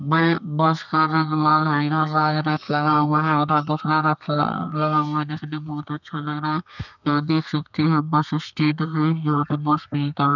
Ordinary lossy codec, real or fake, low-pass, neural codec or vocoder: none; fake; 7.2 kHz; codec, 32 kHz, 1.9 kbps, SNAC